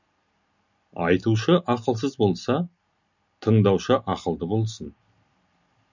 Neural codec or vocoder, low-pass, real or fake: none; 7.2 kHz; real